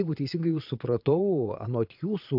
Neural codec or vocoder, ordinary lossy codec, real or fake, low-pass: vocoder, 22.05 kHz, 80 mel bands, Vocos; AAC, 48 kbps; fake; 5.4 kHz